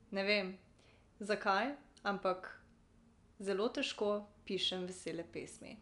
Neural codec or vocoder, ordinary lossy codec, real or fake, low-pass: none; none; real; 10.8 kHz